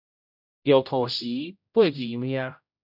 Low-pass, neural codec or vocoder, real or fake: 5.4 kHz; codec, 16 kHz, 0.5 kbps, X-Codec, HuBERT features, trained on general audio; fake